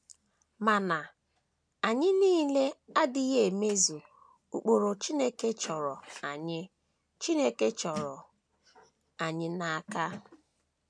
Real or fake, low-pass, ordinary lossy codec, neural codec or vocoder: real; none; none; none